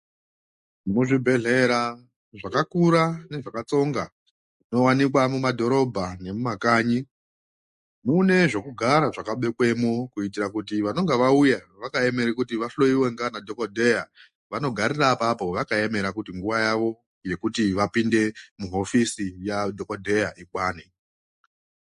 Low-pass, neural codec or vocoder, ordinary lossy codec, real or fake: 14.4 kHz; none; MP3, 48 kbps; real